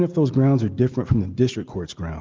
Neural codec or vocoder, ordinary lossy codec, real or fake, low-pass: none; Opus, 32 kbps; real; 7.2 kHz